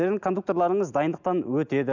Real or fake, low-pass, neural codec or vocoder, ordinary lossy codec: real; 7.2 kHz; none; none